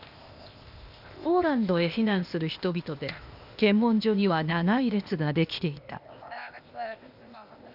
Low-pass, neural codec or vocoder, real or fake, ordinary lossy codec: 5.4 kHz; codec, 16 kHz, 0.8 kbps, ZipCodec; fake; none